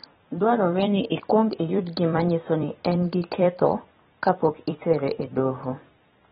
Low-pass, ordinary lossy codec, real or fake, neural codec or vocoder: 19.8 kHz; AAC, 16 kbps; fake; vocoder, 44.1 kHz, 128 mel bands every 256 samples, BigVGAN v2